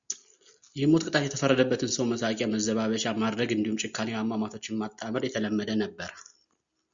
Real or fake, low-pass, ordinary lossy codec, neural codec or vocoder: real; 7.2 kHz; Opus, 64 kbps; none